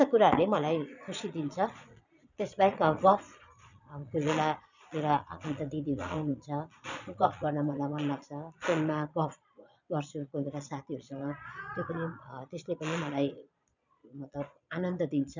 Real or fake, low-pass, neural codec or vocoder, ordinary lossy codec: fake; 7.2 kHz; vocoder, 22.05 kHz, 80 mel bands, Vocos; none